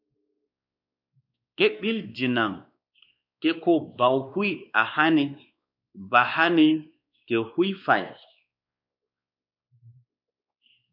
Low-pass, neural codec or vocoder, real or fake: 5.4 kHz; codec, 16 kHz, 2 kbps, X-Codec, WavLM features, trained on Multilingual LibriSpeech; fake